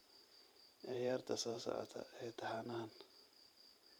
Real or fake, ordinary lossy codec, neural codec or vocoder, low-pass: fake; none; vocoder, 44.1 kHz, 128 mel bands every 512 samples, BigVGAN v2; none